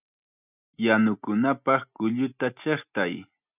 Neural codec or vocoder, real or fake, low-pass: none; real; 3.6 kHz